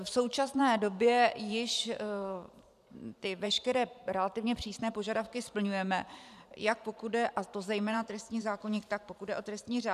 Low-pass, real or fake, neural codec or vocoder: 14.4 kHz; fake; vocoder, 44.1 kHz, 128 mel bands every 512 samples, BigVGAN v2